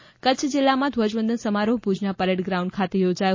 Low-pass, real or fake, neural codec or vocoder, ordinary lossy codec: 7.2 kHz; real; none; MP3, 32 kbps